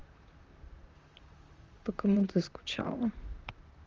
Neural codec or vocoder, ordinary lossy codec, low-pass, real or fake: vocoder, 44.1 kHz, 128 mel bands, Pupu-Vocoder; Opus, 32 kbps; 7.2 kHz; fake